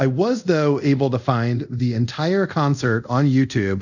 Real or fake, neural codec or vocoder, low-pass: fake; codec, 24 kHz, 0.5 kbps, DualCodec; 7.2 kHz